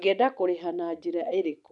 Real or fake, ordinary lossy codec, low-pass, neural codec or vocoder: real; none; 10.8 kHz; none